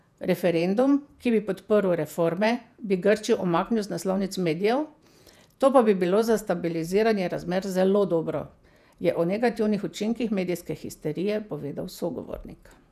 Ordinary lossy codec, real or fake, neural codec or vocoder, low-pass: none; fake; vocoder, 48 kHz, 128 mel bands, Vocos; 14.4 kHz